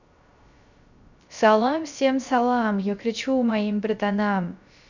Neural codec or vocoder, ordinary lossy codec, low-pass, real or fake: codec, 16 kHz, 0.3 kbps, FocalCodec; none; 7.2 kHz; fake